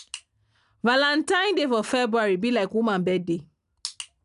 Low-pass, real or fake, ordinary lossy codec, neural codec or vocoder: 10.8 kHz; real; none; none